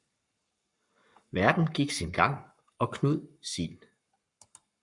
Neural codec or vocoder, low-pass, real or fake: codec, 44.1 kHz, 7.8 kbps, Pupu-Codec; 10.8 kHz; fake